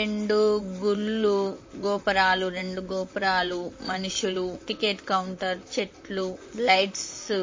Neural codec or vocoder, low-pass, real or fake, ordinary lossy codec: vocoder, 44.1 kHz, 128 mel bands, Pupu-Vocoder; 7.2 kHz; fake; MP3, 32 kbps